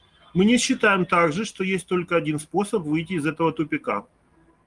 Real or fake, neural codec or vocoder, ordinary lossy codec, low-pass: real; none; Opus, 24 kbps; 10.8 kHz